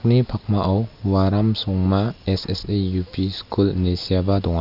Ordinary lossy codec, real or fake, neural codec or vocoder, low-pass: none; real; none; 5.4 kHz